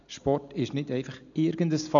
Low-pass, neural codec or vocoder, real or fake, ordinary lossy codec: 7.2 kHz; none; real; none